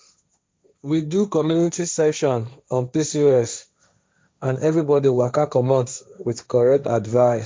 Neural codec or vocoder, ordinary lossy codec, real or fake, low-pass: codec, 16 kHz, 1.1 kbps, Voila-Tokenizer; none; fake; none